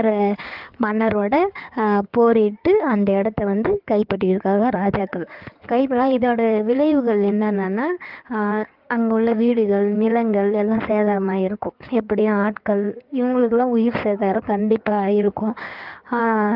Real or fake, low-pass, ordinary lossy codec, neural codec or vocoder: fake; 5.4 kHz; Opus, 24 kbps; codec, 16 kHz in and 24 kHz out, 2.2 kbps, FireRedTTS-2 codec